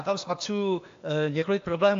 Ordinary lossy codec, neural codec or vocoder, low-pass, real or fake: AAC, 48 kbps; codec, 16 kHz, 0.8 kbps, ZipCodec; 7.2 kHz; fake